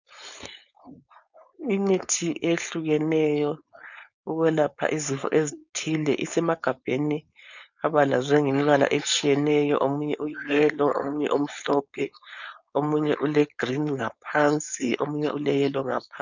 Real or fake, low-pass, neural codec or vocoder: fake; 7.2 kHz; codec, 16 kHz, 4.8 kbps, FACodec